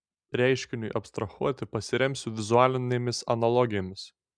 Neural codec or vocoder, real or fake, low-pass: none; real; 9.9 kHz